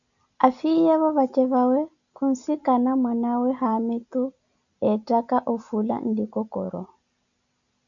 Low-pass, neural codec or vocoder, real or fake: 7.2 kHz; none; real